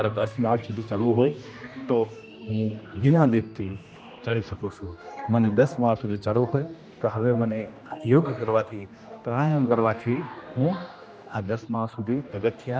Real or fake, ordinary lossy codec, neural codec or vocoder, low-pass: fake; none; codec, 16 kHz, 1 kbps, X-Codec, HuBERT features, trained on general audio; none